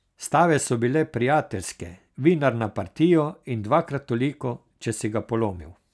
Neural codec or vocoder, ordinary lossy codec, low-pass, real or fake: none; none; none; real